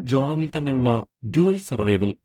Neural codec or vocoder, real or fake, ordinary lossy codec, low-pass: codec, 44.1 kHz, 0.9 kbps, DAC; fake; none; 19.8 kHz